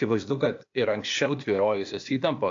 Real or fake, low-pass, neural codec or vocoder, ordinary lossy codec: fake; 7.2 kHz; codec, 16 kHz, 0.8 kbps, ZipCodec; AAC, 48 kbps